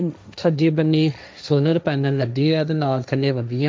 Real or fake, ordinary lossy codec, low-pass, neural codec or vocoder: fake; none; none; codec, 16 kHz, 1.1 kbps, Voila-Tokenizer